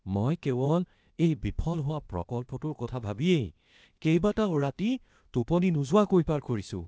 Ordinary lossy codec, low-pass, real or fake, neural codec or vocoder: none; none; fake; codec, 16 kHz, 0.8 kbps, ZipCodec